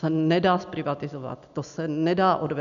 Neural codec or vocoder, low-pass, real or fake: none; 7.2 kHz; real